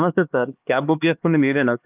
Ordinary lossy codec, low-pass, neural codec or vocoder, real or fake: Opus, 32 kbps; 3.6 kHz; codec, 16 kHz, 2 kbps, X-Codec, HuBERT features, trained on LibriSpeech; fake